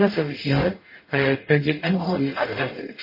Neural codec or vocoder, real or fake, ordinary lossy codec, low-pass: codec, 44.1 kHz, 0.9 kbps, DAC; fake; MP3, 24 kbps; 5.4 kHz